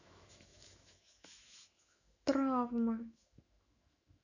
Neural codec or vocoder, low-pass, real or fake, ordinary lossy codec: autoencoder, 48 kHz, 128 numbers a frame, DAC-VAE, trained on Japanese speech; 7.2 kHz; fake; none